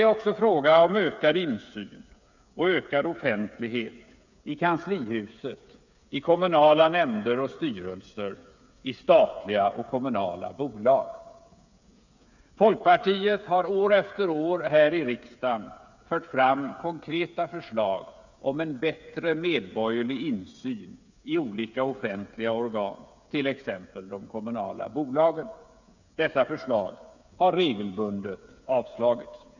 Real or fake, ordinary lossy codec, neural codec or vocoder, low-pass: fake; none; codec, 16 kHz, 8 kbps, FreqCodec, smaller model; 7.2 kHz